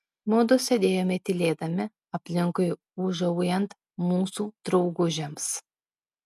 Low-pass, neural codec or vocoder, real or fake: 14.4 kHz; none; real